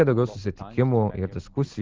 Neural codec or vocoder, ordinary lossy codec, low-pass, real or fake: none; Opus, 16 kbps; 7.2 kHz; real